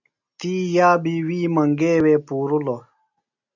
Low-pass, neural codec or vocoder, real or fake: 7.2 kHz; none; real